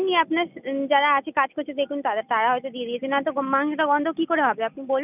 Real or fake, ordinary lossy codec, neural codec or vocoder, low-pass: real; none; none; 3.6 kHz